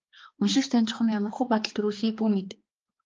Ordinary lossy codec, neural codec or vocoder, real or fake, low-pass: Opus, 24 kbps; codec, 16 kHz, 2 kbps, X-Codec, HuBERT features, trained on balanced general audio; fake; 7.2 kHz